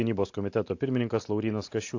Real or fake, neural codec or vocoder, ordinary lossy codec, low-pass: real; none; AAC, 48 kbps; 7.2 kHz